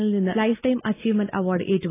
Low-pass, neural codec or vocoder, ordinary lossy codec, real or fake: 3.6 kHz; none; AAC, 16 kbps; real